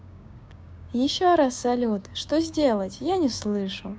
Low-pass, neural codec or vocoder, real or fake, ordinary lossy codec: none; codec, 16 kHz, 6 kbps, DAC; fake; none